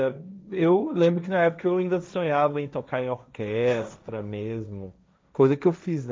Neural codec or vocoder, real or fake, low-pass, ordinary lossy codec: codec, 16 kHz, 1.1 kbps, Voila-Tokenizer; fake; none; none